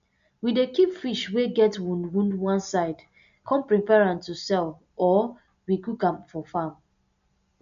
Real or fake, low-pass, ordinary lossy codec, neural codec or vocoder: real; 7.2 kHz; MP3, 64 kbps; none